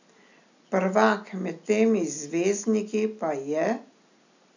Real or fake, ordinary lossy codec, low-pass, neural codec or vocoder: real; none; 7.2 kHz; none